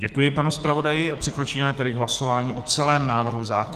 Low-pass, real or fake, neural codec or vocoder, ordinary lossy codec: 14.4 kHz; fake; codec, 44.1 kHz, 2.6 kbps, SNAC; Opus, 32 kbps